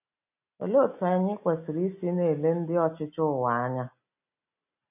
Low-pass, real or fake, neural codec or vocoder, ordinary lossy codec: 3.6 kHz; real; none; none